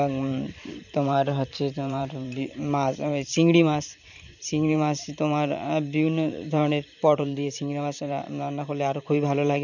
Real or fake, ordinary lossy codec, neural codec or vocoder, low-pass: fake; none; vocoder, 44.1 kHz, 128 mel bands every 512 samples, BigVGAN v2; 7.2 kHz